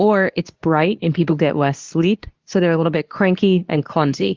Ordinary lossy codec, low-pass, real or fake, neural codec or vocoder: Opus, 32 kbps; 7.2 kHz; fake; codec, 24 kHz, 0.9 kbps, WavTokenizer, medium speech release version 2